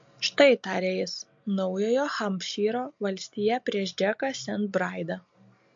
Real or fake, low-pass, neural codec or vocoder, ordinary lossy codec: real; 7.2 kHz; none; MP3, 48 kbps